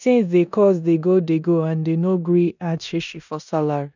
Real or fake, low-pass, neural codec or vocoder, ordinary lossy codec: fake; 7.2 kHz; codec, 16 kHz in and 24 kHz out, 0.9 kbps, LongCat-Audio-Codec, four codebook decoder; none